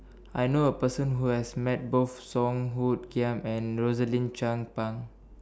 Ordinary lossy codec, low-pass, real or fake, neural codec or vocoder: none; none; real; none